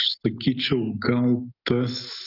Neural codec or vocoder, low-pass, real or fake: none; 5.4 kHz; real